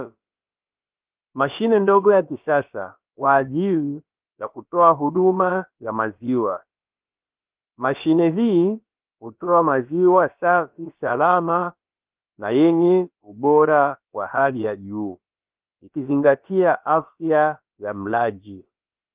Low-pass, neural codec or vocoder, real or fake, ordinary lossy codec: 3.6 kHz; codec, 16 kHz, about 1 kbps, DyCAST, with the encoder's durations; fake; Opus, 32 kbps